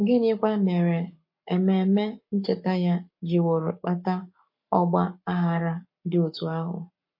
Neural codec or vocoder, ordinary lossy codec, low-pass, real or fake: codec, 44.1 kHz, 7.8 kbps, Pupu-Codec; MP3, 32 kbps; 5.4 kHz; fake